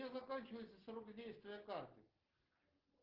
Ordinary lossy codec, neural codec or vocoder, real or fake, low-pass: Opus, 16 kbps; none; real; 5.4 kHz